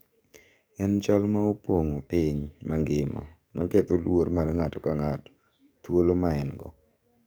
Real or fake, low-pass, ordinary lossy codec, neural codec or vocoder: fake; none; none; codec, 44.1 kHz, 7.8 kbps, DAC